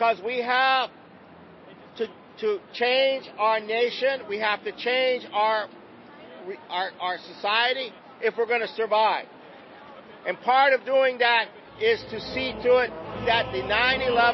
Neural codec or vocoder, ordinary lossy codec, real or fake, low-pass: none; MP3, 24 kbps; real; 7.2 kHz